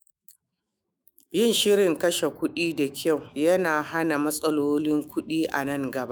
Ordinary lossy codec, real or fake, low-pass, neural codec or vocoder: none; fake; none; autoencoder, 48 kHz, 128 numbers a frame, DAC-VAE, trained on Japanese speech